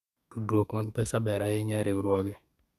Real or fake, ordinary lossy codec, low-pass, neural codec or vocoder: fake; none; 14.4 kHz; codec, 32 kHz, 1.9 kbps, SNAC